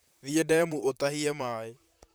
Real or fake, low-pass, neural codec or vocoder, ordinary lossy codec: fake; none; vocoder, 44.1 kHz, 128 mel bands, Pupu-Vocoder; none